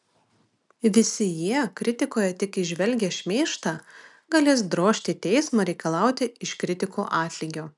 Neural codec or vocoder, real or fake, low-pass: none; real; 10.8 kHz